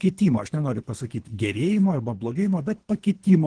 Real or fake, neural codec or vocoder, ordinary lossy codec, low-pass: fake; codec, 24 kHz, 3 kbps, HILCodec; Opus, 16 kbps; 9.9 kHz